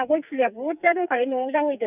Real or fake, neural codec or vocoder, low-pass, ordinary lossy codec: fake; codec, 44.1 kHz, 2.6 kbps, SNAC; 3.6 kHz; none